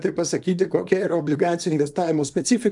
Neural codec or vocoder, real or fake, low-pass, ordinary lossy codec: codec, 24 kHz, 0.9 kbps, WavTokenizer, small release; fake; 10.8 kHz; AAC, 64 kbps